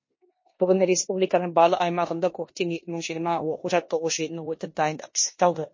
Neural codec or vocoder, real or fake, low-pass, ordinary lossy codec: codec, 16 kHz in and 24 kHz out, 0.9 kbps, LongCat-Audio-Codec, four codebook decoder; fake; 7.2 kHz; MP3, 32 kbps